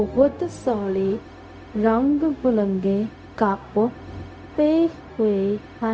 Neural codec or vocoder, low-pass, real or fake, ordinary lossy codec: codec, 16 kHz, 0.4 kbps, LongCat-Audio-Codec; none; fake; none